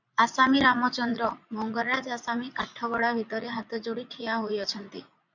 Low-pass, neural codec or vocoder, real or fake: 7.2 kHz; vocoder, 44.1 kHz, 80 mel bands, Vocos; fake